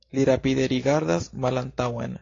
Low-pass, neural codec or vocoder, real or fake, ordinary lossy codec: 7.2 kHz; none; real; AAC, 32 kbps